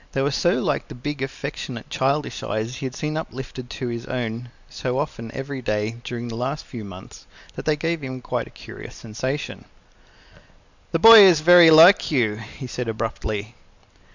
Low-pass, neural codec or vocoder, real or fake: 7.2 kHz; none; real